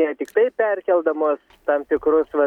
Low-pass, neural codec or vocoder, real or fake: 19.8 kHz; none; real